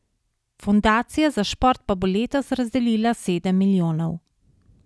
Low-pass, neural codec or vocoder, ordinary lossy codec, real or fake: none; none; none; real